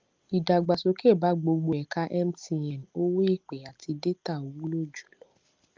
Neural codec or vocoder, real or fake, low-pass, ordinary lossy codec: none; real; 7.2 kHz; Opus, 32 kbps